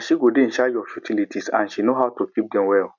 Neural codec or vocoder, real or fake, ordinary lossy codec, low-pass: none; real; none; 7.2 kHz